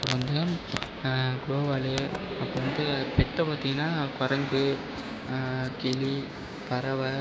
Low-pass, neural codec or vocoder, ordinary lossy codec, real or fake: none; codec, 16 kHz, 6 kbps, DAC; none; fake